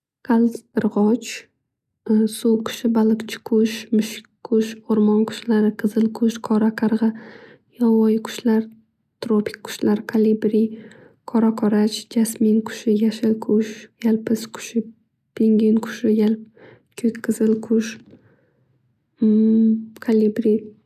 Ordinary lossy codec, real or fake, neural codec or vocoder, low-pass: none; real; none; 14.4 kHz